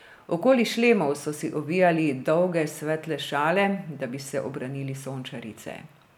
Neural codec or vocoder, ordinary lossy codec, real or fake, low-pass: none; none; real; 19.8 kHz